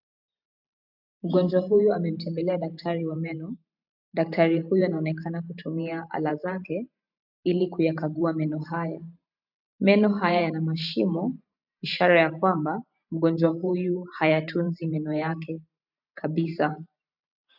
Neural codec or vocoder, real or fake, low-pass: vocoder, 44.1 kHz, 128 mel bands every 512 samples, BigVGAN v2; fake; 5.4 kHz